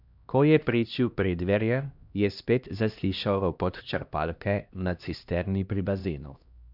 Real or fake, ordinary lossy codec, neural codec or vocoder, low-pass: fake; none; codec, 16 kHz, 1 kbps, X-Codec, HuBERT features, trained on LibriSpeech; 5.4 kHz